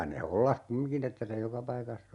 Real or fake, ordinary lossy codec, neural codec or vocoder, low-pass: real; none; none; 10.8 kHz